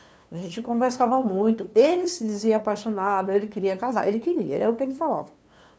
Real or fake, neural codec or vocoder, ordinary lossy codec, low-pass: fake; codec, 16 kHz, 2 kbps, FunCodec, trained on LibriTTS, 25 frames a second; none; none